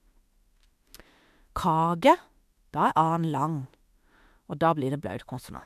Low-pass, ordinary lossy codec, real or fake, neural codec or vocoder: 14.4 kHz; MP3, 96 kbps; fake; autoencoder, 48 kHz, 32 numbers a frame, DAC-VAE, trained on Japanese speech